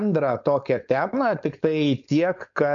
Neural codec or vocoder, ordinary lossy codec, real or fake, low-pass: codec, 16 kHz, 4.8 kbps, FACodec; AAC, 64 kbps; fake; 7.2 kHz